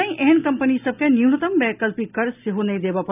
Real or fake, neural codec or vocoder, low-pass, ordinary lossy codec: real; none; 3.6 kHz; none